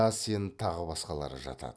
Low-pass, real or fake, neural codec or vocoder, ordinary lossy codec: none; real; none; none